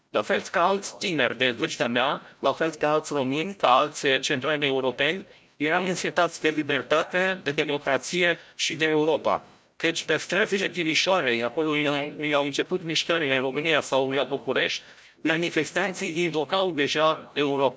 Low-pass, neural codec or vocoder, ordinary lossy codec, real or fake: none; codec, 16 kHz, 0.5 kbps, FreqCodec, larger model; none; fake